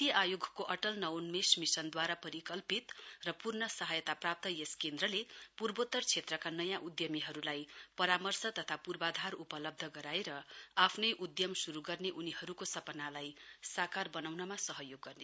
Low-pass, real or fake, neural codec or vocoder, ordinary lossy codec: none; real; none; none